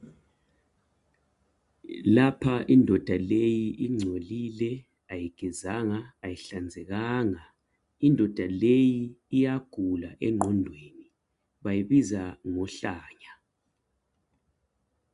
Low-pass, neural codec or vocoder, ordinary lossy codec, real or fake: 10.8 kHz; none; AAC, 96 kbps; real